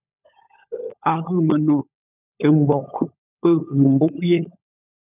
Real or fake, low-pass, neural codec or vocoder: fake; 3.6 kHz; codec, 16 kHz, 16 kbps, FunCodec, trained on LibriTTS, 50 frames a second